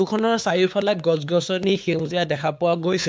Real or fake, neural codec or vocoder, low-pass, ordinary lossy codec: fake; codec, 16 kHz, 4 kbps, X-Codec, WavLM features, trained on Multilingual LibriSpeech; none; none